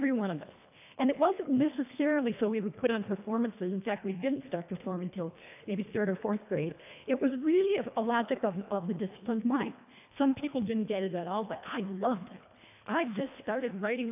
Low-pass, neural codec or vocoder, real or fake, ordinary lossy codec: 3.6 kHz; codec, 24 kHz, 1.5 kbps, HILCodec; fake; AAC, 32 kbps